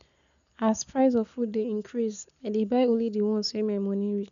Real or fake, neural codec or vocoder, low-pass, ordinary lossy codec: real; none; 7.2 kHz; none